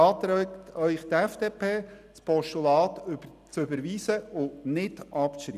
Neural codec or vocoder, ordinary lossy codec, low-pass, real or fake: none; none; 14.4 kHz; real